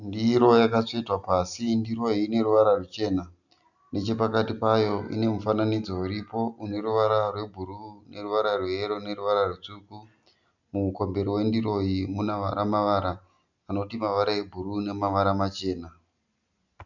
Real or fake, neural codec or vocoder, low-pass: real; none; 7.2 kHz